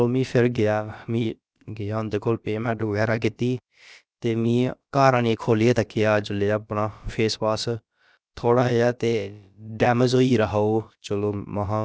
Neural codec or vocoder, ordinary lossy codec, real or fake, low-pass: codec, 16 kHz, about 1 kbps, DyCAST, with the encoder's durations; none; fake; none